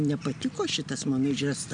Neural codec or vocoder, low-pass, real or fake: none; 9.9 kHz; real